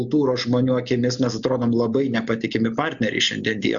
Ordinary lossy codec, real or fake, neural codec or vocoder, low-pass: Opus, 64 kbps; real; none; 7.2 kHz